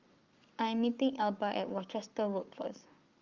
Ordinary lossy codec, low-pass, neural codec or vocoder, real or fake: Opus, 32 kbps; 7.2 kHz; codec, 44.1 kHz, 7.8 kbps, Pupu-Codec; fake